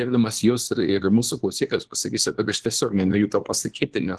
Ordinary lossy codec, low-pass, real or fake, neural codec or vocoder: Opus, 16 kbps; 10.8 kHz; fake; codec, 24 kHz, 0.9 kbps, WavTokenizer, small release